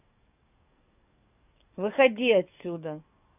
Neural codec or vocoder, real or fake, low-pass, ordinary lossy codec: codec, 44.1 kHz, 7.8 kbps, DAC; fake; 3.6 kHz; none